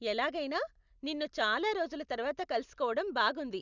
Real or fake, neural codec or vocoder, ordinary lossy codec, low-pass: real; none; none; 7.2 kHz